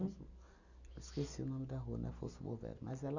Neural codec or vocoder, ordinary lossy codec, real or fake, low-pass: none; none; real; 7.2 kHz